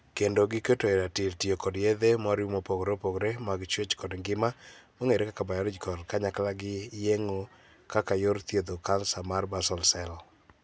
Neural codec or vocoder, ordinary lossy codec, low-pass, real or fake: none; none; none; real